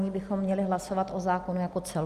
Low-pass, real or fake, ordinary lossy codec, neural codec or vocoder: 14.4 kHz; real; Opus, 32 kbps; none